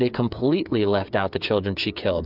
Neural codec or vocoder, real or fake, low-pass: codec, 16 kHz, 8 kbps, FreqCodec, smaller model; fake; 5.4 kHz